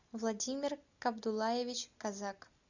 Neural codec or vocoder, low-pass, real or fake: none; 7.2 kHz; real